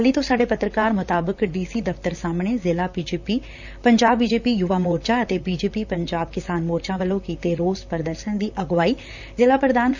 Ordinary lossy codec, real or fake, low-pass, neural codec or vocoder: none; fake; 7.2 kHz; vocoder, 44.1 kHz, 128 mel bands, Pupu-Vocoder